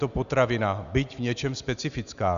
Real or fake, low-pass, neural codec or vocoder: real; 7.2 kHz; none